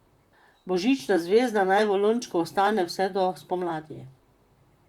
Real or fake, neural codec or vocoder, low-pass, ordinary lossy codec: fake; vocoder, 44.1 kHz, 128 mel bands, Pupu-Vocoder; 19.8 kHz; Opus, 64 kbps